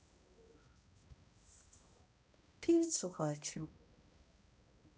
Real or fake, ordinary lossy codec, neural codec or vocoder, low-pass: fake; none; codec, 16 kHz, 0.5 kbps, X-Codec, HuBERT features, trained on balanced general audio; none